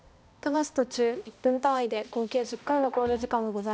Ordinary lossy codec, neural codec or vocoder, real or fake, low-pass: none; codec, 16 kHz, 1 kbps, X-Codec, HuBERT features, trained on balanced general audio; fake; none